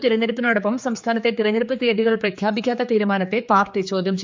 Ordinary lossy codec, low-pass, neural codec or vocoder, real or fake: MP3, 64 kbps; 7.2 kHz; codec, 16 kHz, 4 kbps, X-Codec, HuBERT features, trained on general audio; fake